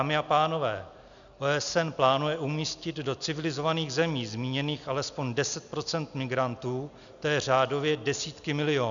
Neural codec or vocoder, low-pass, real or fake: none; 7.2 kHz; real